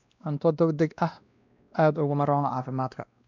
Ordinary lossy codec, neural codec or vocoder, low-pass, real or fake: none; codec, 16 kHz, 1 kbps, X-Codec, WavLM features, trained on Multilingual LibriSpeech; 7.2 kHz; fake